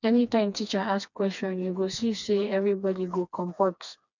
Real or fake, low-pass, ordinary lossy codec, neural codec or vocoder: fake; 7.2 kHz; none; codec, 16 kHz, 2 kbps, FreqCodec, smaller model